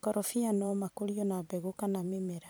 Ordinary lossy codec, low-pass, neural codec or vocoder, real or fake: none; none; vocoder, 44.1 kHz, 128 mel bands every 512 samples, BigVGAN v2; fake